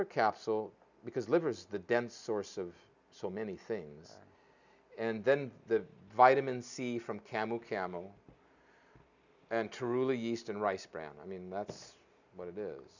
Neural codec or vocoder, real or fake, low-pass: none; real; 7.2 kHz